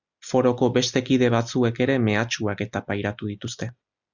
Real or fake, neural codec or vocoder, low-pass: real; none; 7.2 kHz